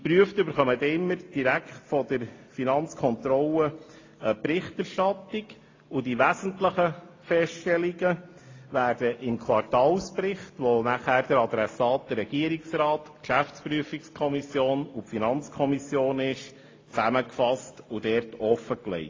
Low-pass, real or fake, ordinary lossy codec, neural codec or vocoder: 7.2 kHz; real; AAC, 32 kbps; none